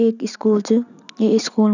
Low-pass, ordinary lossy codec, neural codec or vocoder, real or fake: 7.2 kHz; none; none; real